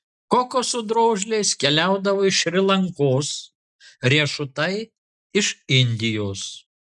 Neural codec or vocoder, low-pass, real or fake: none; 10.8 kHz; real